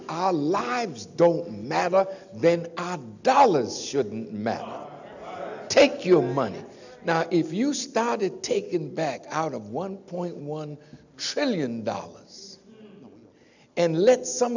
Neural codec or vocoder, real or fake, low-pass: none; real; 7.2 kHz